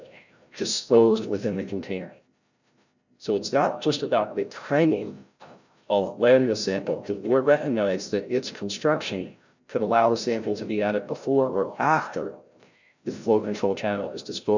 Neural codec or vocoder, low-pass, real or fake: codec, 16 kHz, 0.5 kbps, FreqCodec, larger model; 7.2 kHz; fake